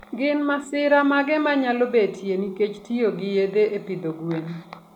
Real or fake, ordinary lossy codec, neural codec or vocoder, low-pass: real; none; none; 19.8 kHz